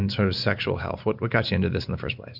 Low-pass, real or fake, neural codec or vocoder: 5.4 kHz; real; none